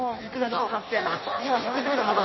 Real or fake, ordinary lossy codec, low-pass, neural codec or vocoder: fake; MP3, 24 kbps; 7.2 kHz; codec, 16 kHz in and 24 kHz out, 0.6 kbps, FireRedTTS-2 codec